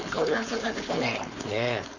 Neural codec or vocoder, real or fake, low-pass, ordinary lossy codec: codec, 16 kHz, 4.8 kbps, FACodec; fake; 7.2 kHz; none